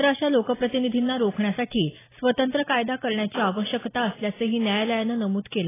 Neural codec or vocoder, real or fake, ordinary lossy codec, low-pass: none; real; AAC, 16 kbps; 3.6 kHz